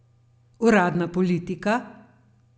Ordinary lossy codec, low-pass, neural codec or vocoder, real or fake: none; none; none; real